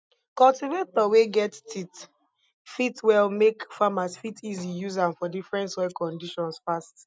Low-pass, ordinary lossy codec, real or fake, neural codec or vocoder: none; none; real; none